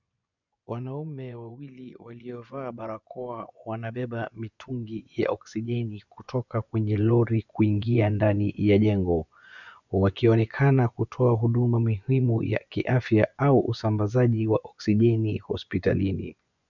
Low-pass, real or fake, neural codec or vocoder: 7.2 kHz; fake; vocoder, 24 kHz, 100 mel bands, Vocos